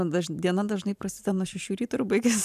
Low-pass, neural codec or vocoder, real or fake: 14.4 kHz; vocoder, 44.1 kHz, 128 mel bands every 512 samples, BigVGAN v2; fake